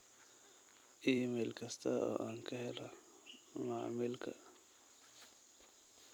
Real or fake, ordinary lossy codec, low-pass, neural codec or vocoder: fake; none; none; vocoder, 44.1 kHz, 128 mel bands every 256 samples, BigVGAN v2